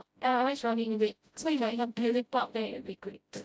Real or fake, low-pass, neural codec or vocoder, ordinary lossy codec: fake; none; codec, 16 kHz, 0.5 kbps, FreqCodec, smaller model; none